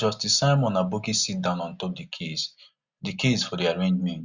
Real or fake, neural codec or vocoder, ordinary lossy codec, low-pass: real; none; Opus, 64 kbps; 7.2 kHz